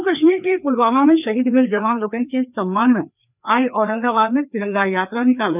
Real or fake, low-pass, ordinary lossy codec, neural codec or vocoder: fake; 3.6 kHz; none; codec, 16 kHz, 2 kbps, FreqCodec, larger model